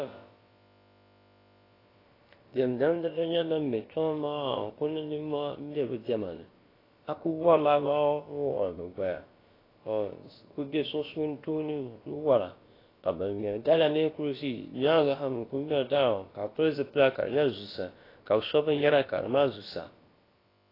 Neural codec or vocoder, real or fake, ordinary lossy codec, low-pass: codec, 16 kHz, about 1 kbps, DyCAST, with the encoder's durations; fake; AAC, 24 kbps; 5.4 kHz